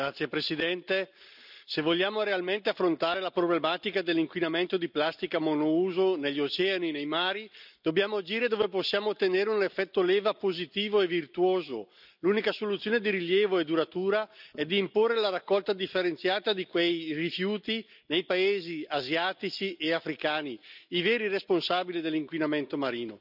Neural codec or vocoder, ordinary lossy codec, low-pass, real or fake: none; none; 5.4 kHz; real